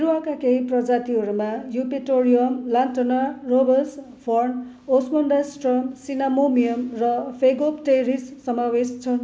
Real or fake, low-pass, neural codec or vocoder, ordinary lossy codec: real; none; none; none